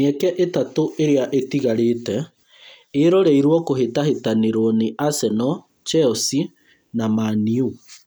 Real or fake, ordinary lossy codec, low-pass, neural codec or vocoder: real; none; none; none